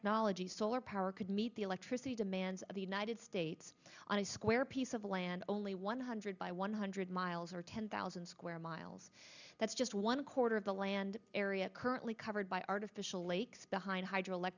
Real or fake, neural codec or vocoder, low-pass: real; none; 7.2 kHz